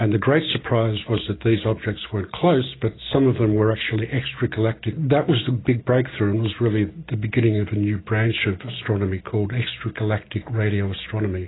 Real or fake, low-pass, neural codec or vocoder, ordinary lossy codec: real; 7.2 kHz; none; AAC, 16 kbps